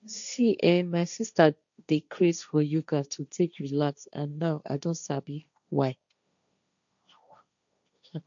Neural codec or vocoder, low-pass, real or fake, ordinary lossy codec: codec, 16 kHz, 1.1 kbps, Voila-Tokenizer; 7.2 kHz; fake; none